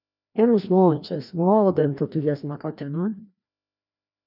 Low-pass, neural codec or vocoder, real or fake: 5.4 kHz; codec, 16 kHz, 1 kbps, FreqCodec, larger model; fake